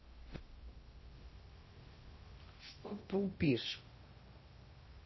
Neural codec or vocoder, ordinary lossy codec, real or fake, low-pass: codec, 16 kHz in and 24 kHz out, 0.6 kbps, FocalCodec, streaming, 2048 codes; MP3, 24 kbps; fake; 7.2 kHz